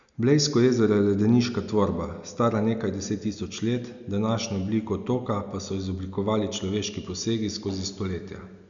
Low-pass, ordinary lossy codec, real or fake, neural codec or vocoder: 7.2 kHz; none; real; none